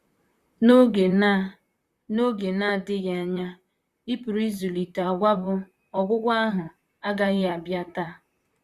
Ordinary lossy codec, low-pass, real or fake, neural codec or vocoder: Opus, 64 kbps; 14.4 kHz; fake; vocoder, 44.1 kHz, 128 mel bands, Pupu-Vocoder